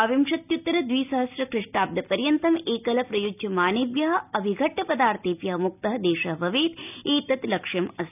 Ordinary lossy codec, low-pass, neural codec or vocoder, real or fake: none; 3.6 kHz; none; real